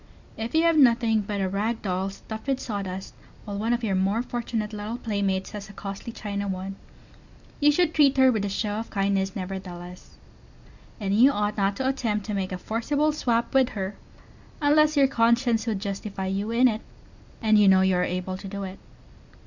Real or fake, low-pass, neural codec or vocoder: real; 7.2 kHz; none